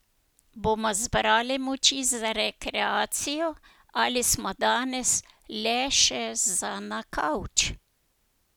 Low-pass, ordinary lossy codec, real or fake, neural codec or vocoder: none; none; real; none